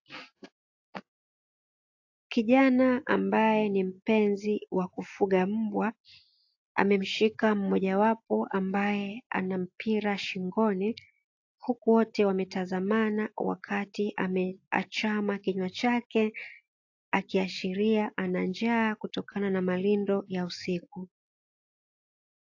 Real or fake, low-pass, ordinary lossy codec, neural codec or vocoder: real; 7.2 kHz; AAC, 48 kbps; none